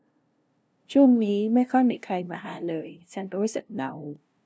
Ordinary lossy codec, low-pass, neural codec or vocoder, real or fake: none; none; codec, 16 kHz, 0.5 kbps, FunCodec, trained on LibriTTS, 25 frames a second; fake